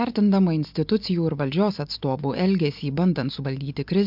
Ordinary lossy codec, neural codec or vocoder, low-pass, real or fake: MP3, 48 kbps; none; 5.4 kHz; real